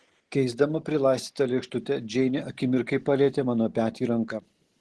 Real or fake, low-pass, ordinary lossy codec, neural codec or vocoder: real; 10.8 kHz; Opus, 16 kbps; none